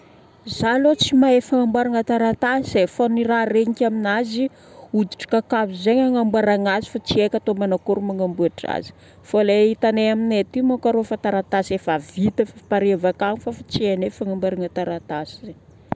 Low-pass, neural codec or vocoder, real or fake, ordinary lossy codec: none; none; real; none